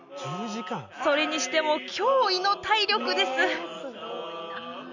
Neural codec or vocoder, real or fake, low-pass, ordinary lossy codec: none; real; 7.2 kHz; none